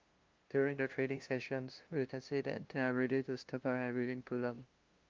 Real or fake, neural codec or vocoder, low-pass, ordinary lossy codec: fake; codec, 16 kHz, 0.5 kbps, FunCodec, trained on LibriTTS, 25 frames a second; 7.2 kHz; Opus, 24 kbps